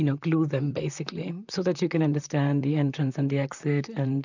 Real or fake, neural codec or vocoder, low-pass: fake; vocoder, 44.1 kHz, 128 mel bands, Pupu-Vocoder; 7.2 kHz